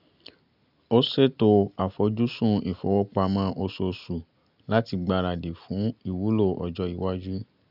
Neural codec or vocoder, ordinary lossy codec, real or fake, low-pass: none; none; real; 5.4 kHz